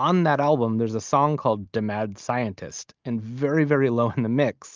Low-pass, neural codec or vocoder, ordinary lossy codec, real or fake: 7.2 kHz; none; Opus, 24 kbps; real